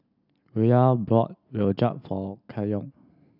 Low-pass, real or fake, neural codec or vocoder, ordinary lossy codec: 5.4 kHz; real; none; none